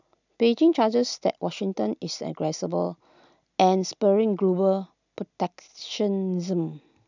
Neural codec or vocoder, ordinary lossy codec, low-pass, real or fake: none; none; 7.2 kHz; real